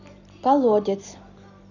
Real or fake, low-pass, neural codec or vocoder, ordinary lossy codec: real; 7.2 kHz; none; none